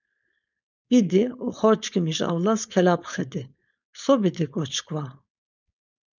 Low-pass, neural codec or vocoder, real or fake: 7.2 kHz; codec, 16 kHz, 4.8 kbps, FACodec; fake